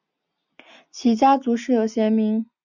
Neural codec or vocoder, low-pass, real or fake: none; 7.2 kHz; real